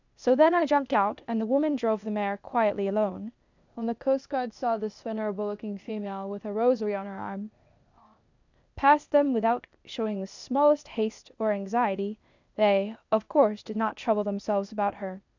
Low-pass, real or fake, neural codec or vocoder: 7.2 kHz; fake; codec, 16 kHz, 0.8 kbps, ZipCodec